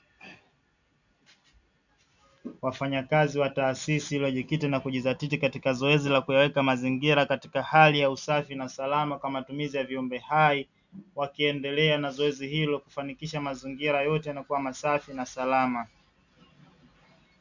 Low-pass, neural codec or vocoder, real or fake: 7.2 kHz; none; real